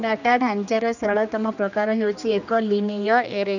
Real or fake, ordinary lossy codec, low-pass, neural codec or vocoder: fake; none; 7.2 kHz; codec, 16 kHz, 2 kbps, X-Codec, HuBERT features, trained on general audio